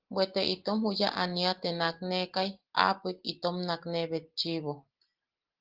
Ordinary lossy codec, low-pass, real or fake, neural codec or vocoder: Opus, 16 kbps; 5.4 kHz; real; none